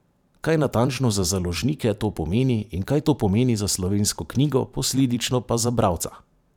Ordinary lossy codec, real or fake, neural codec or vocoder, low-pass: none; fake; vocoder, 44.1 kHz, 128 mel bands every 512 samples, BigVGAN v2; 19.8 kHz